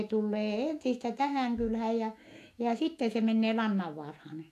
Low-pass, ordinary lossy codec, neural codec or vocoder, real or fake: 14.4 kHz; AAC, 64 kbps; autoencoder, 48 kHz, 128 numbers a frame, DAC-VAE, trained on Japanese speech; fake